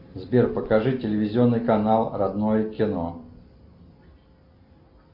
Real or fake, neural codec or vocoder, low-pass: real; none; 5.4 kHz